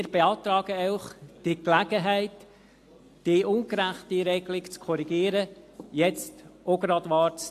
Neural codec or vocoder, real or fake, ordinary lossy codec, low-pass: none; real; AAC, 64 kbps; 14.4 kHz